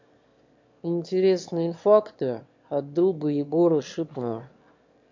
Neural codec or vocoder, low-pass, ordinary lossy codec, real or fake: autoencoder, 22.05 kHz, a latent of 192 numbers a frame, VITS, trained on one speaker; 7.2 kHz; MP3, 48 kbps; fake